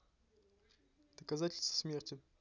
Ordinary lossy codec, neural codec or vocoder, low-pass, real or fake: none; none; 7.2 kHz; real